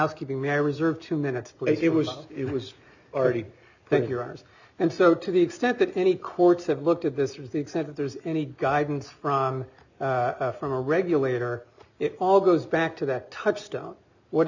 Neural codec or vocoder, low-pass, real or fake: none; 7.2 kHz; real